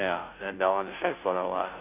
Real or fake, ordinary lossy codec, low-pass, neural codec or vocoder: fake; none; 3.6 kHz; codec, 16 kHz, 0.5 kbps, FunCodec, trained on Chinese and English, 25 frames a second